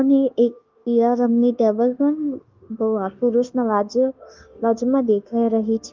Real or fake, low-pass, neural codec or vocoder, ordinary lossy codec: fake; 7.2 kHz; codec, 16 kHz, 0.9 kbps, LongCat-Audio-Codec; Opus, 32 kbps